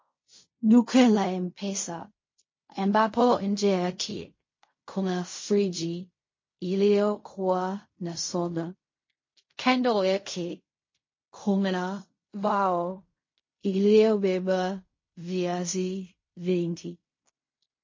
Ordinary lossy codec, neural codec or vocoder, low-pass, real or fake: MP3, 32 kbps; codec, 16 kHz in and 24 kHz out, 0.4 kbps, LongCat-Audio-Codec, fine tuned four codebook decoder; 7.2 kHz; fake